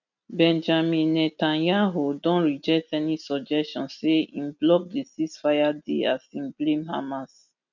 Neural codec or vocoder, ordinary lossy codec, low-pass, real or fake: none; none; 7.2 kHz; real